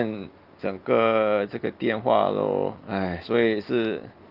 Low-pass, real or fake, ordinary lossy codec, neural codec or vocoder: 5.4 kHz; real; Opus, 32 kbps; none